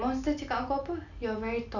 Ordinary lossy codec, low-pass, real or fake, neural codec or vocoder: none; 7.2 kHz; real; none